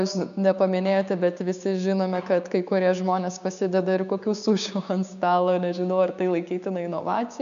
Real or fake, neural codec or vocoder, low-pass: fake; codec, 16 kHz, 6 kbps, DAC; 7.2 kHz